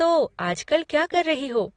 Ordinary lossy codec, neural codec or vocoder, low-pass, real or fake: AAC, 32 kbps; none; 9.9 kHz; real